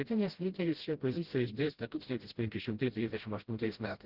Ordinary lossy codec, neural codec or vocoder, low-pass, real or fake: Opus, 16 kbps; codec, 16 kHz, 0.5 kbps, FreqCodec, smaller model; 5.4 kHz; fake